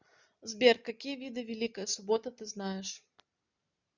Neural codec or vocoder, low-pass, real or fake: none; 7.2 kHz; real